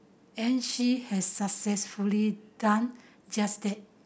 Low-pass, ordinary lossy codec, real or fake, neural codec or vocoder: none; none; real; none